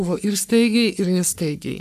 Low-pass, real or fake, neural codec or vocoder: 14.4 kHz; fake; codec, 44.1 kHz, 3.4 kbps, Pupu-Codec